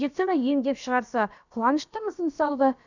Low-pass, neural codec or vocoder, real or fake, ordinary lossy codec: 7.2 kHz; codec, 16 kHz, about 1 kbps, DyCAST, with the encoder's durations; fake; none